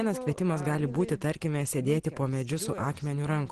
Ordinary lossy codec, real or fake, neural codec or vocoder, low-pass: Opus, 16 kbps; real; none; 10.8 kHz